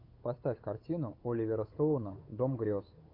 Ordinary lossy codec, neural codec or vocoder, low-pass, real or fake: AAC, 32 kbps; codec, 16 kHz, 8 kbps, FunCodec, trained on Chinese and English, 25 frames a second; 5.4 kHz; fake